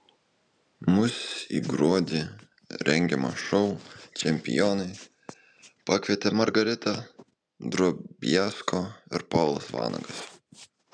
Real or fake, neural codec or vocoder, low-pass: real; none; 9.9 kHz